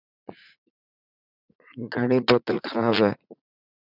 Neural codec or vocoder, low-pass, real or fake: vocoder, 44.1 kHz, 80 mel bands, Vocos; 5.4 kHz; fake